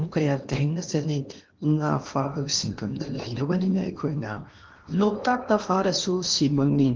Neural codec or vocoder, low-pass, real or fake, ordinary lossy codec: codec, 16 kHz in and 24 kHz out, 0.8 kbps, FocalCodec, streaming, 65536 codes; 7.2 kHz; fake; Opus, 32 kbps